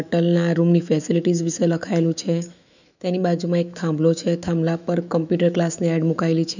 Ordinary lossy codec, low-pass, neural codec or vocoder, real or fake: none; 7.2 kHz; autoencoder, 48 kHz, 128 numbers a frame, DAC-VAE, trained on Japanese speech; fake